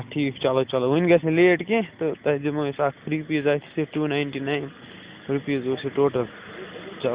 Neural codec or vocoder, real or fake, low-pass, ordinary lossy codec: none; real; 3.6 kHz; Opus, 64 kbps